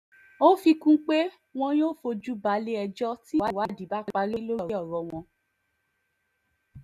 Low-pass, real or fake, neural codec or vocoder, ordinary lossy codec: 14.4 kHz; real; none; none